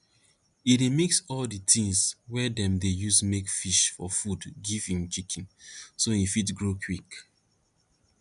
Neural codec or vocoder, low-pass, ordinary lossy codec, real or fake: none; 10.8 kHz; MP3, 96 kbps; real